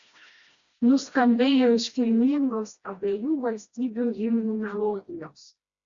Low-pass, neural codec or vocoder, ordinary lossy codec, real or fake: 7.2 kHz; codec, 16 kHz, 1 kbps, FreqCodec, smaller model; Opus, 64 kbps; fake